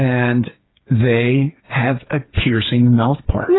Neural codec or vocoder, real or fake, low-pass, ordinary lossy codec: codec, 16 kHz, 4 kbps, FreqCodec, smaller model; fake; 7.2 kHz; AAC, 16 kbps